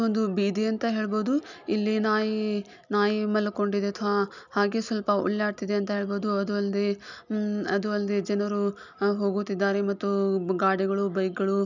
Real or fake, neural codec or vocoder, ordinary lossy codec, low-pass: real; none; none; 7.2 kHz